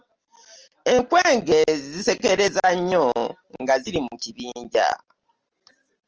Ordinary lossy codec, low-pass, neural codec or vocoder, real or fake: Opus, 24 kbps; 7.2 kHz; none; real